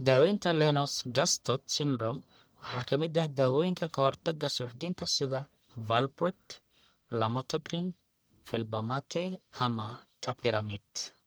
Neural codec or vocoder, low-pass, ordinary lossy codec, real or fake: codec, 44.1 kHz, 1.7 kbps, Pupu-Codec; none; none; fake